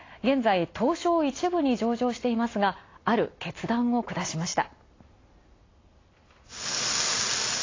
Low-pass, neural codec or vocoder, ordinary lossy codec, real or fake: 7.2 kHz; none; AAC, 32 kbps; real